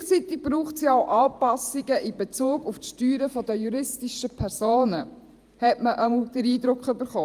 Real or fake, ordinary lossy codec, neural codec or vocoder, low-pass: fake; Opus, 32 kbps; vocoder, 48 kHz, 128 mel bands, Vocos; 14.4 kHz